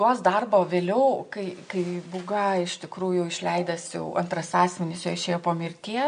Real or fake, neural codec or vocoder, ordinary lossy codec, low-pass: real; none; MP3, 48 kbps; 9.9 kHz